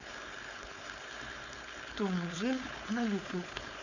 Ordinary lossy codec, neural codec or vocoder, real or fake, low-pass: none; codec, 16 kHz, 4.8 kbps, FACodec; fake; 7.2 kHz